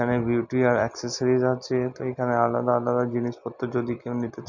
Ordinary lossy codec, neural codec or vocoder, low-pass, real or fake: none; none; 7.2 kHz; real